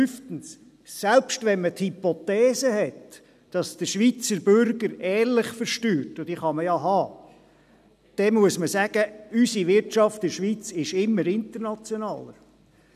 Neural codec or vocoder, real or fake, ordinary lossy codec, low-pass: none; real; none; 14.4 kHz